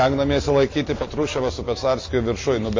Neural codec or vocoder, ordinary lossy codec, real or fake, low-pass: none; MP3, 32 kbps; real; 7.2 kHz